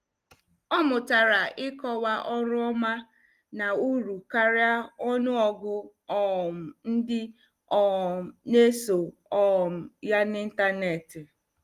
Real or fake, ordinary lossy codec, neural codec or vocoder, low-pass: real; Opus, 24 kbps; none; 14.4 kHz